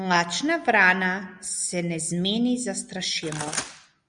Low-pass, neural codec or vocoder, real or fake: 9.9 kHz; none; real